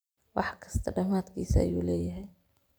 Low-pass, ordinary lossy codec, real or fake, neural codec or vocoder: none; none; real; none